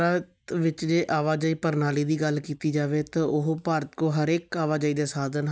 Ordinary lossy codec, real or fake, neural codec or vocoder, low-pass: none; real; none; none